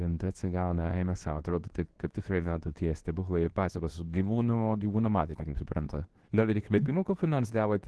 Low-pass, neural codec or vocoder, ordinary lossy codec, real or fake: 10.8 kHz; codec, 24 kHz, 0.9 kbps, WavTokenizer, medium speech release version 2; Opus, 16 kbps; fake